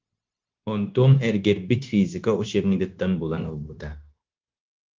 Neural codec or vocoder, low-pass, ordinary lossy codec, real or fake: codec, 16 kHz, 0.9 kbps, LongCat-Audio-Codec; 7.2 kHz; Opus, 24 kbps; fake